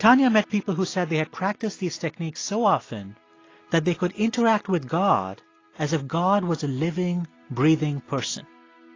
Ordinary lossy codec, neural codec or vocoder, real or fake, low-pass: AAC, 32 kbps; none; real; 7.2 kHz